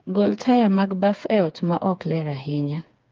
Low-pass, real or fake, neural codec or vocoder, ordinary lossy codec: 7.2 kHz; fake; codec, 16 kHz, 4 kbps, FreqCodec, smaller model; Opus, 32 kbps